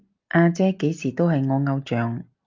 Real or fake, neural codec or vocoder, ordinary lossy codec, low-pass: real; none; Opus, 24 kbps; 7.2 kHz